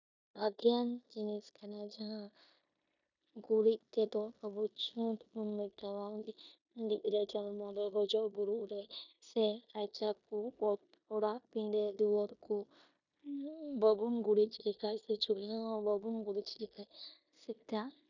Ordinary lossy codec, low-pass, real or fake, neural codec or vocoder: none; 7.2 kHz; fake; codec, 16 kHz in and 24 kHz out, 0.9 kbps, LongCat-Audio-Codec, four codebook decoder